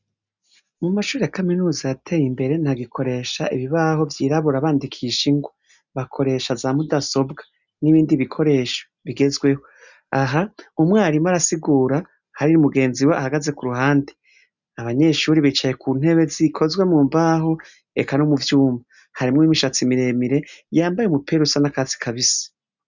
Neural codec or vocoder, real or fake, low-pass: none; real; 7.2 kHz